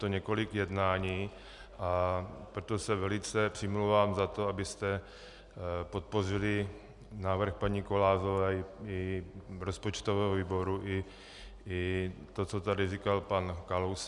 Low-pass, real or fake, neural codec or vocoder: 10.8 kHz; real; none